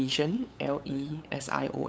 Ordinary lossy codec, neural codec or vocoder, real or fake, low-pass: none; codec, 16 kHz, 8 kbps, FunCodec, trained on LibriTTS, 25 frames a second; fake; none